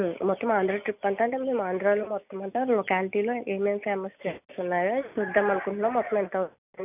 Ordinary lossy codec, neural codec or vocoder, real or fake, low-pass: none; none; real; 3.6 kHz